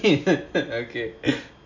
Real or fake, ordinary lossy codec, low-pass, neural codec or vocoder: real; MP3, 64 kbps; 7.2 kHz; none